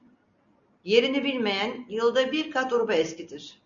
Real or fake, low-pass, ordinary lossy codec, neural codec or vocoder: real; 7.2 kHz; MP3, 96 kbps; none